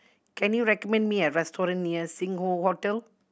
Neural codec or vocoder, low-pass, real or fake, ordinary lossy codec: none; none; real; none